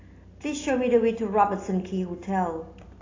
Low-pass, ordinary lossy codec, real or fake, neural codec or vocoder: 7.2 kHz; AAC, 32 kbps; real; none